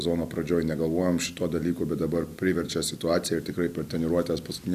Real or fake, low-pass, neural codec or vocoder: real; 14.4 kHz; none